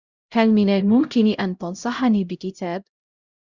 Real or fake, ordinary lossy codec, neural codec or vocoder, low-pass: fake; Opus, 64 kbps; codec, 16 kHz, 0.5 kbps, X-Codec, HuBERT features, trained on LibriSpeech; 7.2 kHz